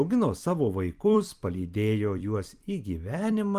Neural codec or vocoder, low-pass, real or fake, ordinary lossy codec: vocoder, 44.1 kHz, 128 mel bands every 256 samples, BigVGAN v2; 14.4 kHz; fake; Opus, 32 kbps